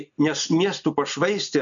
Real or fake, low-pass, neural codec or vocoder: real; 7.2 kHz; none